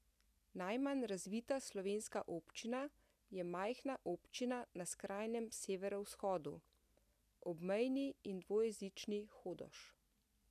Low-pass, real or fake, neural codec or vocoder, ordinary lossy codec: 14.4 kHz; real; none; none